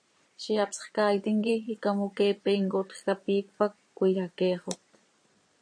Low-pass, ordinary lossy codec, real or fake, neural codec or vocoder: 9.9 kHz; MP3, 48 kbps; fake; vocoder, 22.05 kHz, 80 mel bands, Vocos